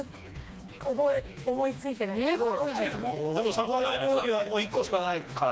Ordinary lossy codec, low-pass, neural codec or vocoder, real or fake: none; none; codec, 16 kHz, 2 kbps, FreqCodec, smaller model; fake